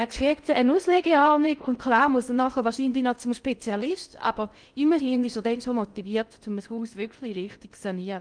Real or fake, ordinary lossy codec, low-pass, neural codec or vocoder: fake; Opus, 32 kbps; 9.9 kHz; codec, 16 kHz in and 24 kHz out, 0.6 kbps, FocalCodec, streaming, 2048 codes